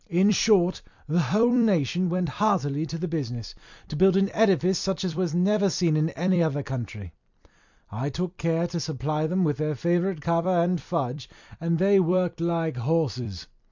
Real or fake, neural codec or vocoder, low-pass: fake; vocoder, 44.1 kHz, 128 mel bands every 256 samples, BigVGAN v2; 7.2 kHz